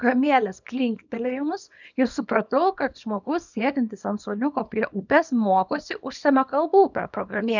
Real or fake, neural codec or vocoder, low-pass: fake; codec, 24 kHz, 0.9 kbps, WavTokenizer, small release; 7.2 kHz